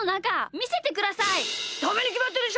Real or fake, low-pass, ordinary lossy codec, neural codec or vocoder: real; none; none; none